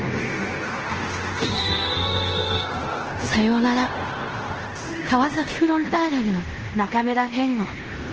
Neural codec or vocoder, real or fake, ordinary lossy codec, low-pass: codec, 16 kHz in and 24 kHz out, 0.9 kbps, LongCat-Audio-Codec, fine tuned four codebook decoder; fake; Opus, 16 kbps; 7.2 kHz